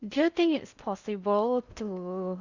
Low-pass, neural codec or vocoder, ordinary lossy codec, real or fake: 7.2 kHz; codec, 16 kHz in and 24 kHz out, 0.6 kbps, FocalCodec, streaming, 4096 codes; Opus, 64 kbps; fake